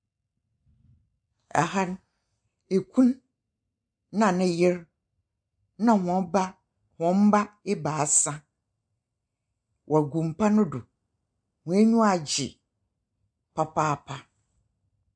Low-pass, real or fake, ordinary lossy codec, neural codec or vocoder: 9.9 kHz; real; MP3, 64 kbps; none